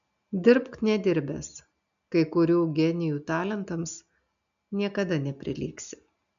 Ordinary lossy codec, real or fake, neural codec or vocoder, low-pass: MP3, 96 kbps; real; none; 7.2 kHz